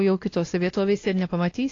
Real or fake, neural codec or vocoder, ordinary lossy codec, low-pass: fake; codec, 16 kHz, 1 kbps, X-Codec, WavLM features, trained on Multilingual LibriSpeech; AAC, 32 kbps; 7.2 kHz